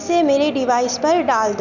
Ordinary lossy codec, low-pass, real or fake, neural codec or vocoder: none; 7.2 kHz; real; none